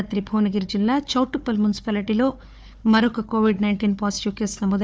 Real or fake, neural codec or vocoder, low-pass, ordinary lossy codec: fake; codec, 16 kHz, 4 kbps, FunCodec, trained on Chinese and English, 50 frames a second; none; none